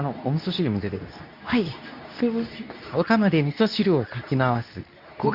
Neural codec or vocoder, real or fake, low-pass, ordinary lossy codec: codec, 24 kHz, 0.9 kbps, WavTokenizer, medium speech release version 2; fake; 5.4 kHz; none